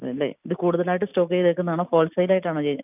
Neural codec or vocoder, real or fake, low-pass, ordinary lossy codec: none; real; 3.6 kHz; none